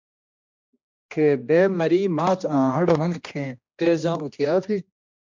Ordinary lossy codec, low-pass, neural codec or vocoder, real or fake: MP3, 64 kbps; 7.2 kHz; codec, 16 kHz, 1 kbps, X-Codec, HuBERT features, trained on general audio; fake